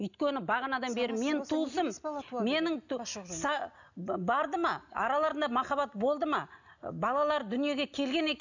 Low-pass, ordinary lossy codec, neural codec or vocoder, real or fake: 7.2 kHz; none; none; real